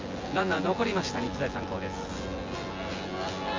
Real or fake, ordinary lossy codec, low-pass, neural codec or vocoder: fake; Opus, 32 kbps; 7.2 kHz; vocoder, 24 kHz, 100 mel bands, Vocos